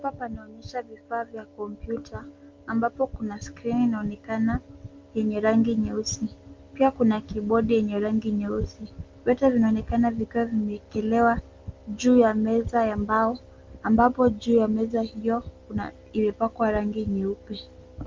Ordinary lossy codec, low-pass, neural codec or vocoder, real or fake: Opus, 24 kbps; 7.2 kHz; none; real